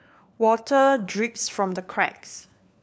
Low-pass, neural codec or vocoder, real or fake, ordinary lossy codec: none; codec, 16 kHz, 4 kbps, FunCodec, trained on LibriTTS, 50 frames a second; fake; none